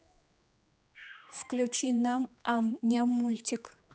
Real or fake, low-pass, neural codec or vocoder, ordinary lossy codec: fake; none; codec, 16 kHz, 2 kbps, X-Codec, HuBERT features, trained on general audio; none